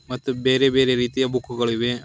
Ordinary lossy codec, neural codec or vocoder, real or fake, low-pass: none; none; real; none